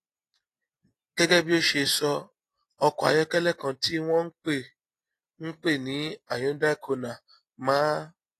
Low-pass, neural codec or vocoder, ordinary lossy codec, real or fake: 14.4 kHz; none; AAC, 48 kbps; real